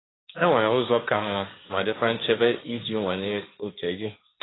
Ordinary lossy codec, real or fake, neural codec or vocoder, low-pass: AAC, 16 kbps; fake; codec, 16 kHz, 1.1 kbps, Voila-Tokenizer; 7.2 kHz